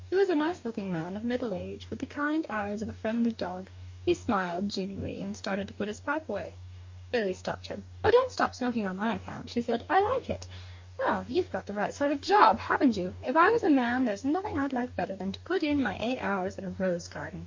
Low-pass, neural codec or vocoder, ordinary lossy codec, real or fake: 7.2 kHz; codec, 44.1 kHz, 2.6 kbps, DAC; MP3, 48 kbps; fake